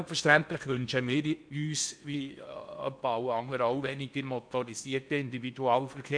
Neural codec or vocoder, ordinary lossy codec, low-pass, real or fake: codec, 16 kHz in and 24 kHz out, 0.8 kbps, FocalCodec, streaming, 65536 codes; none; 9.9 kHz; fake